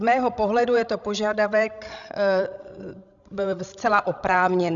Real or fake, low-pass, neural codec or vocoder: fake; 7.2 kHz; codec, 16 kHz, 16 kbps, FreqCodec, larger model